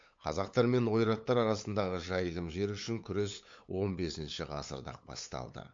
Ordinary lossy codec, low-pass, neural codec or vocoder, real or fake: MP3, 48 kbps; 7.2 kHz; codec, 16 kHz, 8 kbps, FunCodec, trained on LibriTTS, 25 frames a second; fake